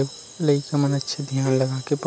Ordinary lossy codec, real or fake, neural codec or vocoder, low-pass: none; real; none; none